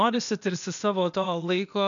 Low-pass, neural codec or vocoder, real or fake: 7.2 kHz; codec, 16 kHz, 0.8 kbps, ZipCodec; fake